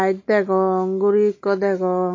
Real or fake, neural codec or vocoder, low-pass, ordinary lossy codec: real; none; 7.2 kHz; MP3, 32 kbps